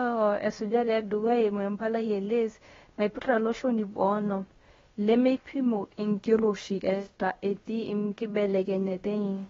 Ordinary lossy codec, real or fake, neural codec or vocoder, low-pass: AAC, 24 kbps; fake; codec, 16 kHz, about 1 kbps, DyCAST, with the encoder's durations; 7.2 kHz